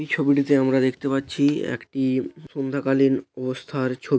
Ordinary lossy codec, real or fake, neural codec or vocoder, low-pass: none; real; none; none